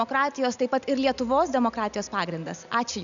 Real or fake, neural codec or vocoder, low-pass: real; none; 7.2 kHz